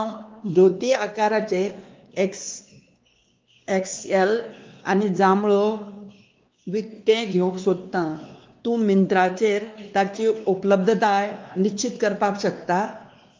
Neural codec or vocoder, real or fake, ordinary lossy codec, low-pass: codec, 16 kHz, 2 kbps, X-Codec, WavLM features, trained on Multilingual LibriSpeech; fake; Opus, 32 kbps; 7.2 kHz